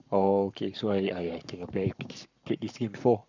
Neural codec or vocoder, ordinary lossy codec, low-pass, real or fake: codec, 44.1 kHz, 7.8 kbps, Pupu-Codec; none; 7.2 kHz; fake